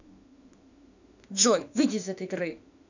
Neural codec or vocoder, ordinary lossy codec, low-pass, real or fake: autoencoder, 48 kHz, 32 numbers a frame, DAC-VAE, trained on Japanese speech; none; 7.2 kHz; fake